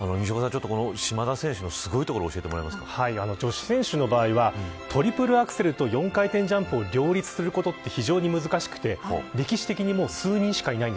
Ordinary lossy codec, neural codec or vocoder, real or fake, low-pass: none; none; real; none